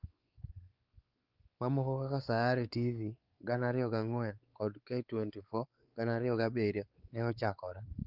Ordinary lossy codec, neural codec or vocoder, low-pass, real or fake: Opus, 32 kbps; codec, 16 kHz, 4 kbps, X-Codec, WavLM features, trained on Multilingual LibriSpeech; 5.4 kHz; fake